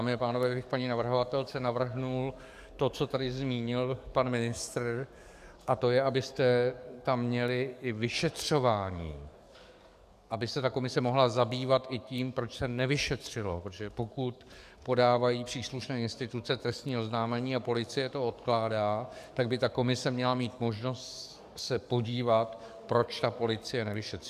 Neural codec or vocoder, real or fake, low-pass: codec, 44.1 kHz, 7.8 kbps, DAC; fake; 14.4 kHz